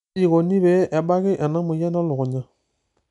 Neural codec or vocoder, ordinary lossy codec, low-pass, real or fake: none; none; 10.8 kHz; real